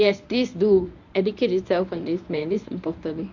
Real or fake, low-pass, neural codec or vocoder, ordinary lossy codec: fake; 7.2 kHz; codec, 24 kHz, 0.9 kbps, WavTokenizer, medium speech release version 1; none